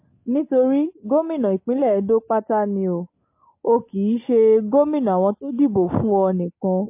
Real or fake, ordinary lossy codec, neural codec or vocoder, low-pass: real; MP3, 24 kbps; none; 3.6 kHz